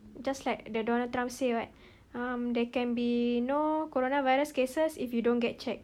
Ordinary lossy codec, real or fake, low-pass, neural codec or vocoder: none; real; 19.8 kHz; none